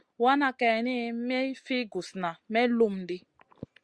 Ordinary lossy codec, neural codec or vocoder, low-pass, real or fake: Opus, 64 kbps; none; 9.9 kHz; real